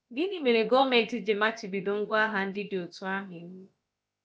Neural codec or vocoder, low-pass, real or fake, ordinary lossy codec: codec, 16 kHz, about 1 kbps, DyCAST, with the encoder's durations; none; fake; none